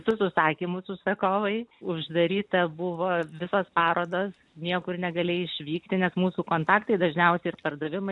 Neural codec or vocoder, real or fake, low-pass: none; real; 10.8 kHz